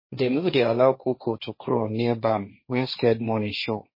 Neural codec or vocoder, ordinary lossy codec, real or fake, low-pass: codec, 16 kHz, 1.1 kbps, Voila-Tokenizer; MP3, 24 kbps; fake; 5.4 kHz